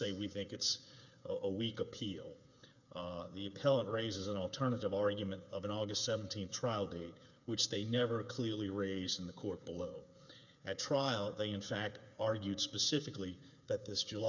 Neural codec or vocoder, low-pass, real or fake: codec, 16 kHz, 8 kbps, FreqCodec, smaller model; 7.2 kHz; fake